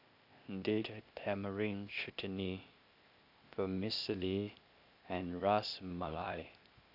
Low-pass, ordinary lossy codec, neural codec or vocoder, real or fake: 5.4 kHz; Opus, 64 kbps; codec, 16 kHz, 0.8 kbps, ZipCodec; fake